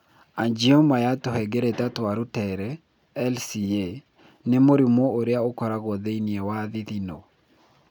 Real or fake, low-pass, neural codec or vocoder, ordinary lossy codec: real; 19.8 kHz; none; none